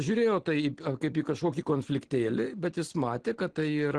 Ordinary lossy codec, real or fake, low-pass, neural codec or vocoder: Opus, 16 kbps; real; 9.9 kHz; none